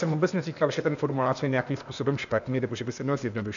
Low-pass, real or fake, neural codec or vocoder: 7.2 kHz; fake; codec, 16 kHz, 0.8 kbps, ZipCodec